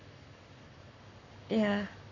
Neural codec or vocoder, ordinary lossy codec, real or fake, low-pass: codec, 44.1 kHz, 7.8 kbps, Pupu-Codec; none; fake; 7.2 kHz